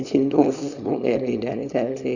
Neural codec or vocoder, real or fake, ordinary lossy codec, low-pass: codec, 24 kHz, 0.9 kbps, WavTokenizer, small release; fake; none; 7.2 kHz